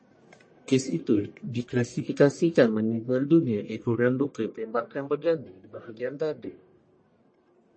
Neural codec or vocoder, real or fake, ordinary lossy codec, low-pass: codec, 44.1 kHz, 1.7 kbps, Pupu-Codec; fake; MP3, 32 kbps; 9.9 kHz